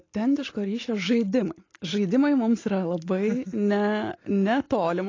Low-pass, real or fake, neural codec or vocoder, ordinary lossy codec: 7.2 kHz; real; none; AAC, 32 kbps